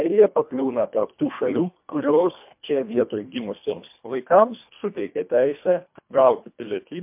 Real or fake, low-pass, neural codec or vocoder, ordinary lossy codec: fake; 3.6 kHz; codec, 24 kHz, 1.5 kbps, HILCodec; MP3, 32 kbps